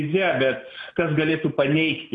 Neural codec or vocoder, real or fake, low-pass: none; real; 10.8 kHz